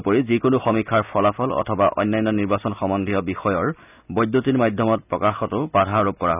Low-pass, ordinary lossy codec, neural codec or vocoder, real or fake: 3.6 kHz; none; none; real